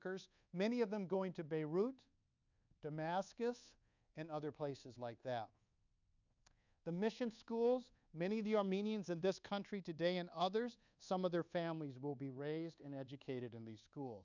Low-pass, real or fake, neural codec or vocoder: 7.2 kHz; fake; codec, 24 kHz, 1.2 kbps, DualCodec